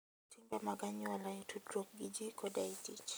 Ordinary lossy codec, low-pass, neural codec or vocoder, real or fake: none; none; none; real